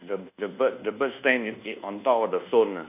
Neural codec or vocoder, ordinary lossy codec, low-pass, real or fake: codec, 24 kHz, 1.2 kbps, DualCodec; none; 3.6 kHz; fake